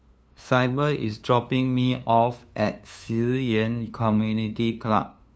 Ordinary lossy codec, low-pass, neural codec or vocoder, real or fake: none; none; codec, 16 kHz, 2 kbps, FunCodec, trained on LibriTTS, 25 frames a second; fake